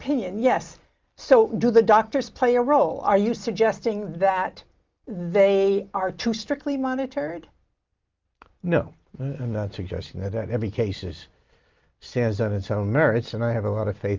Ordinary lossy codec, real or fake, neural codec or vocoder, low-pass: Opus, 32 kbps; real; none; 7.2 kHz